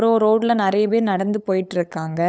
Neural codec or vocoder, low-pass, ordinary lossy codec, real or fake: codec, 16 kHz, 8 kbps, FunCodec, trained on LibriTTS, 25 frames a second; none; none; fake